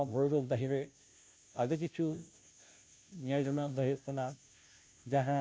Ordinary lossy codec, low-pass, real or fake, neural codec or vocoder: none; none; fake; codec, 16 kHz, 0.5 kbps, FunCodec, trained on Chinese and English, 25 frames a second